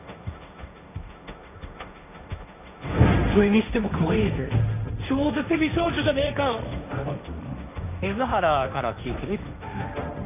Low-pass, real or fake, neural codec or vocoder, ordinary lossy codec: 3.6 kHz; fake; codec, 16 kHz, 1.1 kbps, Voila-Tokenizer; none